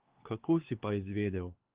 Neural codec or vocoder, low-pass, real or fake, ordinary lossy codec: codec, 16 kHz, 4 kbps, X-Codec, HuBERT features, trained on LibriSpeech; 3.6 kHz; fake; Opus, 16 kbps